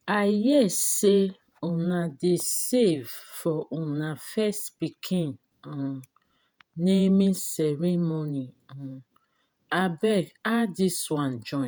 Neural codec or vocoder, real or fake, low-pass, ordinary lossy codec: vocoder, 48 kHz, 128 mel bands, Vocos; fake; none; none